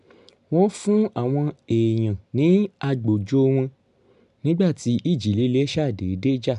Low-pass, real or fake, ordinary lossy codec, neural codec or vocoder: 10.8 kHz; real; MP3, 96 kbps; none